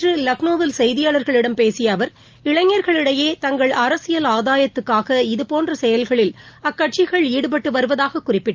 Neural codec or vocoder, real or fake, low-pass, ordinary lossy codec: none; real; 7.2 kHz; Opus, 24 kbps